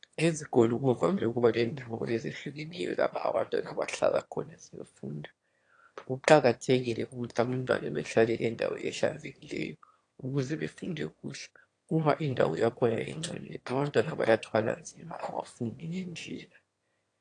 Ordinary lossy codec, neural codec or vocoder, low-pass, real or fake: AAC, 48 kbps; autoencoder, 22.05 kHz, a latent of 192 numbers a frame, VITS, trained on one speaker; 9.9 kHz; fake